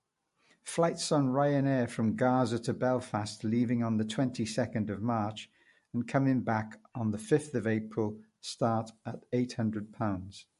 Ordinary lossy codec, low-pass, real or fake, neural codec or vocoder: MP3, 48 kbps; 14.4 kHz; real; none